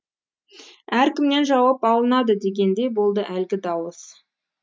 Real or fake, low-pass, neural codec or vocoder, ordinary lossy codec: real; none; none; none